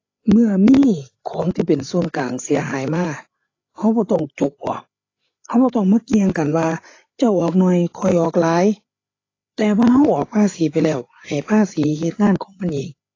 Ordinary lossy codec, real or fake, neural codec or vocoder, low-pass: AAC, 32 kbps; fake; codec, 16 kHz, 8 kbps, FreqCodec, larger model; 7.2 kHz